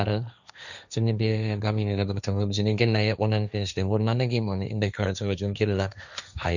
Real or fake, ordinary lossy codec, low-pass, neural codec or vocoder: fake; none; 7.2 kHz; codec, 16 kHz, 1.1 kbps, Voila-Tokenizer